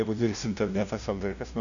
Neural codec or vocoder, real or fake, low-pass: codec, 16 kHz, 0.5 kbps, FunCodec, trained on LibriTTS, 25 frames a second; fake; 7.2 kHz